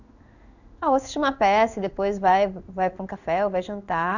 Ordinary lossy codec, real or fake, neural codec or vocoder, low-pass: none; fake; codec, 16 kHz in and 24 kHz out, 1 kbps, XY-Tokenizer; 7.2 kHz